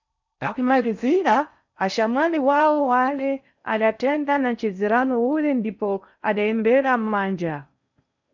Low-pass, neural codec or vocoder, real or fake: 7.2 kHz; codec, 16 kHz in and 24 kHz out, 0.6 kbps, FocalCodec, streaming, 4096 codes; fake